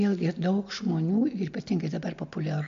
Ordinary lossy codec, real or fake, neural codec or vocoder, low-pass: MP3, 48 kbps; real; none; 7.2 kHz